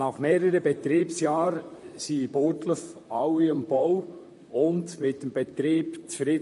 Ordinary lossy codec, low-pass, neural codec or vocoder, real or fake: MP3, 48 kbps; 14.4 kHz; vocoder, 44.1 kHz, 128 mel bands, Pupu-Vocoder; fake